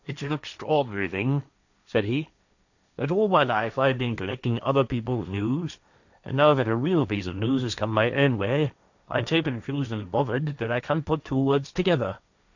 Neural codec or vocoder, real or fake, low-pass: codec, 16 kHz, 1.1 kbps, Voila-Tokenizer; fake; 7.2 kHz